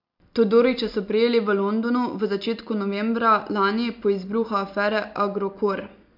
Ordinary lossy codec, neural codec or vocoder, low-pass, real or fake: MP3, 48 kbps; none; 5.4 kHz; real